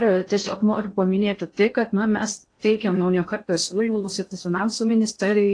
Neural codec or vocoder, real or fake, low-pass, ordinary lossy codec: codec, 16 kHz in and 24 kHz out, 0.6 kbps, FocalCodec, streaming, 4096 codes; fake; 9.9 kHz; AAC, 48 kbps